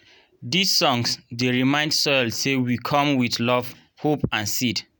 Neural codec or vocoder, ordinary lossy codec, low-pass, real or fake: none; none; none; real